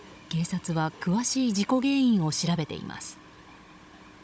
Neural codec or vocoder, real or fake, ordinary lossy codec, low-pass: codec, 16 kHz, 16 kbps, FunCodec, trained on Chinese and English, 50 frames a second; fake; none; none